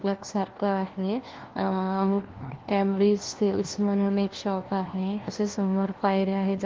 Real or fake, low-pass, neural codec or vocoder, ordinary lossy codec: fake; 7.2 kHz; codec, 16 kHz, 1 kbps, FunCodec, trained on LibriTTS, 50 frames a second; Opus, 16 kbps